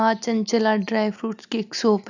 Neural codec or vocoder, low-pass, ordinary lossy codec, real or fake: none; 7.2 kHz; none; real